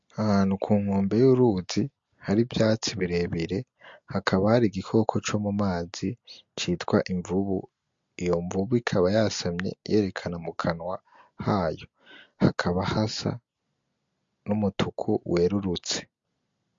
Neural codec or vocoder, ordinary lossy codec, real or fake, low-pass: none; MP3, 48 kbps; real; 7.2 kHz